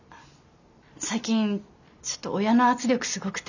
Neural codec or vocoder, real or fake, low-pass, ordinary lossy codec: none; real; 7.2 kHz; none